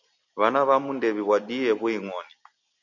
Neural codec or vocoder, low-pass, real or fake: none; 7.2 kHz; real